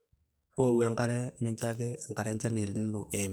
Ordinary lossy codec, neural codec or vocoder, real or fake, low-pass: none; codec, 44.1 kHz, 2.6 kbps, SNAC; fake; none